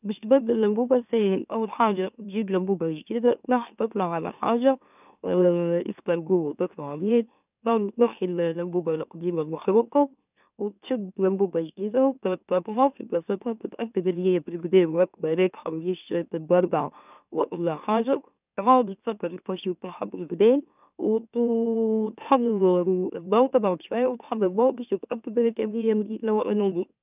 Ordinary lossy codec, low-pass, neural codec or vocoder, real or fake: none; 3.6 kHz; autoencoder, 44.1 kHz, a latent of 192 numbers a frame, MeloTTS; fake